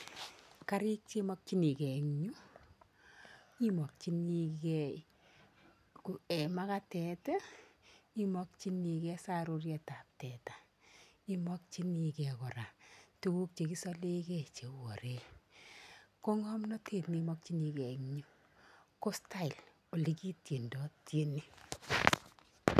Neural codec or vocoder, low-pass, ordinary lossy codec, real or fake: none; 14.4 kHz; none; real